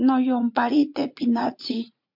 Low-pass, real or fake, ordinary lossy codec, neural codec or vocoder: 5.4 kHz; fake; MP3, 48 kbps; vocoder, 44.1 kHz, 80 mel bands, Vocos